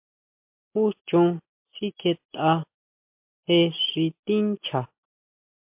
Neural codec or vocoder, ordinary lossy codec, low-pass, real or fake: none; MP3, 32 kbps; 3.6 kHz; real